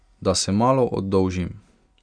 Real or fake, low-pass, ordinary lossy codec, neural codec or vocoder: real; 9.9 kHz; none; none